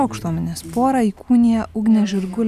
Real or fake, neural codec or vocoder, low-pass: real; none; 14.4 kHz